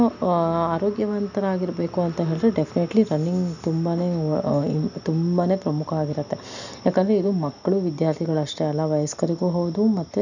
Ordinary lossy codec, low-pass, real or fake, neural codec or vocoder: none; 7.2 kHz; real; none